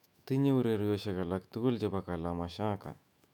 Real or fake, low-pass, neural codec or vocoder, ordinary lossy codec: fake; 19.8 kHz; autoencoder, 48 kHz, 128 numbers a frame, DAC-VAE, trained on Japanese speech; none